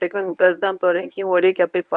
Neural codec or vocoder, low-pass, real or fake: codec, 24 kHz, 0.9 kbps, WavTokenizer, medium speech release version 1; 10.8 kHz; fake